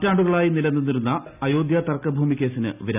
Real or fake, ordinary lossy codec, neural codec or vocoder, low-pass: real; none; none; 3.6 kHz